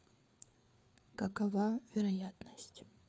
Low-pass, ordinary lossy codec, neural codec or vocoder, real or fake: none; none; codec, 16 kHz, 4 kbps, FreqCodec, larger model; fake